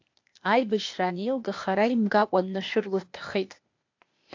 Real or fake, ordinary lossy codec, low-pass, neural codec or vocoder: fake; AAC, 48 kbps; 7.2 kHz; codec, 16 kHz, 0.8 kbps, ZipCodec